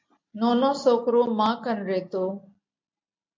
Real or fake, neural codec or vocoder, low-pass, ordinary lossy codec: real; none; 7.2 kHz; MP3, 48 kbps